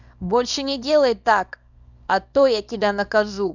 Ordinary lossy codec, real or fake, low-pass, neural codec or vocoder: none; fake; 7.2 kHz; codec, 24 kHz, 0.9 kbps, WavTokenizer, small release